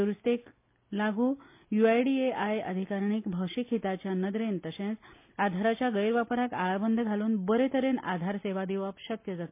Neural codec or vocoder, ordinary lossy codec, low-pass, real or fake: none; MP3, 24 kbps; 3.6 kHz; real